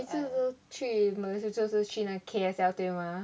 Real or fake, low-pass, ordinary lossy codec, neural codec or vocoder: real; none; none; none